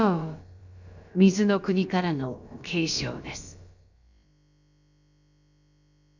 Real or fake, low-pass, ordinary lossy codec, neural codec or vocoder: fake; 7.2 kHz; none; codec, 16 kHz, about 1 kbps, DyCAST, with the encoder's durations